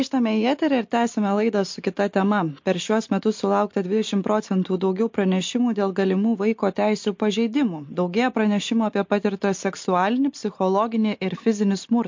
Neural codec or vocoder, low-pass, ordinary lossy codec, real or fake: none; 7.2 kHz; MP3, 48 kbps; real